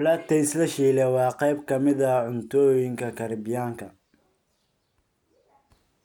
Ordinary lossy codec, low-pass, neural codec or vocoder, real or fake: none; 19.8 kHz; none; real